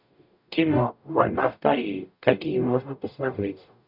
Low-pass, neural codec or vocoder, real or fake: 5.4 kHz; codec, 44.1 kHz, 0.9 kbps, DAC; fake